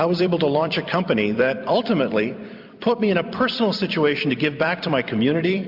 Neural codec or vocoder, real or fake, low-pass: vocoder, 44.1 kHz, 128 mel bands every 256 samples, BigVGAN v2; fake; 5.4 kHz